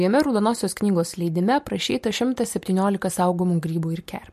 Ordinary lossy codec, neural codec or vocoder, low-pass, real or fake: MP3, 64 kbps; none; 14.4 kHz; real